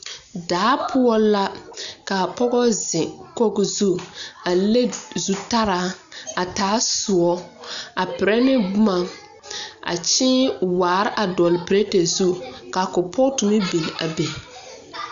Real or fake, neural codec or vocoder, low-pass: real; none; 7.2 kHz